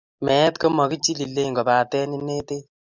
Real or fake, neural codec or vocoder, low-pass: real; none; 7.2 kHz